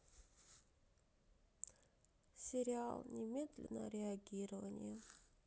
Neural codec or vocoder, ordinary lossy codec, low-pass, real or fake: none; none; none; real